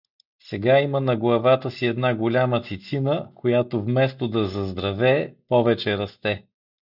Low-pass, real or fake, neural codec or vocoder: 5.4 kHz; real; none